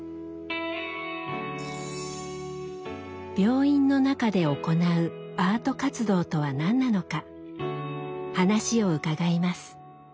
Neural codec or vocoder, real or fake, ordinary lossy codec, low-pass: none; real; none; none